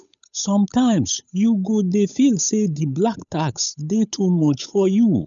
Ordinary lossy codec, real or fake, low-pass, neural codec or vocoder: none; fake; 7.2 kHz; codec, 16 kHz, 8 kbps, FunCodec, trained on Chinese and English, 25 frames a second